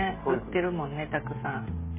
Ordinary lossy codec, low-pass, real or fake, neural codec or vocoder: MP3, 16 kbps; 3.6 kHz; fake; codec, 16 kHz, 16 kbps, FreqCodec, smaller model